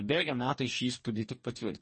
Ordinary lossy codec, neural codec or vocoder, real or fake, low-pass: MP3, 32 kbps; codec, 44.1 kHz, 2.6 kbps, DAC; fake; 10.8 kHz